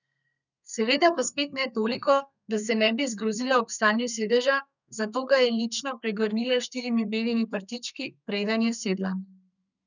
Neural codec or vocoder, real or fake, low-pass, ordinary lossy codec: codec, 32 kHz, 1.9 kbps, SNAC; fake; 7.2 kHz; none